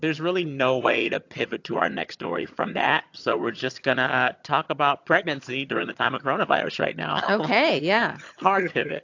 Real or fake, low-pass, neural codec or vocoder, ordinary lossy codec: fake; 7.2 kHz; vocoder, 22.05 kHz, 80 mel bands, HiFi-GAN; AAC, 48 kbps